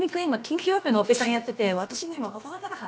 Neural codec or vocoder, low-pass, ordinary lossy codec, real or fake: codec, 16 kHz, about 1 kbps, DyCAST, with the encoder's durations; none; none; fake